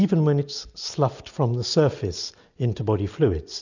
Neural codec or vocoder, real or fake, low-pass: none; real; 7.2 kHz